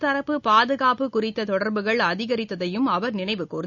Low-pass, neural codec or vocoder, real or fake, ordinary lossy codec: 7.2 kHz; none; real; none